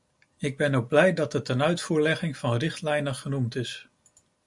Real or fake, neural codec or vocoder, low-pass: real; none; 10.8 kHz